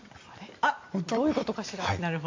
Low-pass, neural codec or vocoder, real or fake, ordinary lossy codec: 7.2 kHz; none; real; MP3, 48 kbps